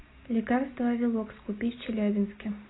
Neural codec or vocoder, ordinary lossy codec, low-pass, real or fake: none; AAC, 16 kbps; 7.2 kHz; real